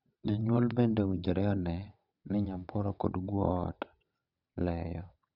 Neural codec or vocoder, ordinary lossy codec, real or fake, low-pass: vocoder, 22.05 kHz, 80 mel bands, WaveNeXt; none; fake; 5.4 kHz